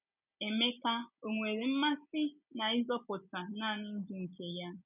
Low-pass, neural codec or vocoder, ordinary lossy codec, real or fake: 3.6 kHz; none; none; real